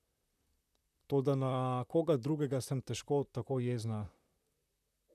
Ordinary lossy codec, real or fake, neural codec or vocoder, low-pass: none; fake; vocoder, 44.1 kHz, 128 mel bands, Pupu-Vocoder; 14.4 kHz